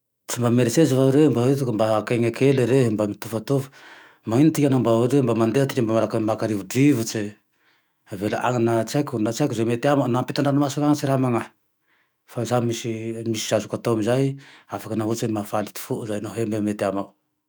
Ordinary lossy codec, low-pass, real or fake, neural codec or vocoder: none; none; fake; autoencoder, 48 kHz, 128 numbers a frame, DAC-VAE, trained on Japanese speech